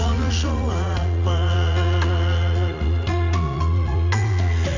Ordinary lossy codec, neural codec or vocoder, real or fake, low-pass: none; vocoder, 44.1 kHz, 80 mel bands, Vocos; fake; 7.2 kHz